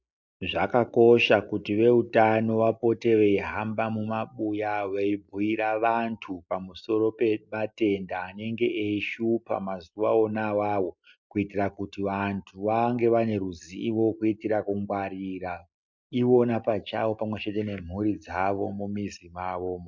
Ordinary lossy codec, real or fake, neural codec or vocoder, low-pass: MP3, 64 kbps; real; none; 7.2 kHz